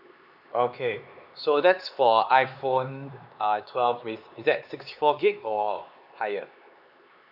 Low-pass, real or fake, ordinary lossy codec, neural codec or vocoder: 5.4 kHz; fake; none; codec, 16 kHz, 4 kbps, X-Codec, HuBERT features, trained on LibriSpeech